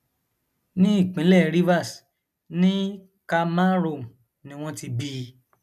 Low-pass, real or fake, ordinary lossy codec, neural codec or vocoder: 14.4 kHz; real; none; none